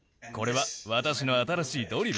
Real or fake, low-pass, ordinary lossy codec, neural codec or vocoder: real; none; none; none